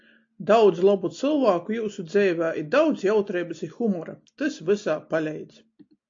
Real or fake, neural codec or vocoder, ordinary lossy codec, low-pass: real; none; AAC, 48 kbps; 7.2 kHz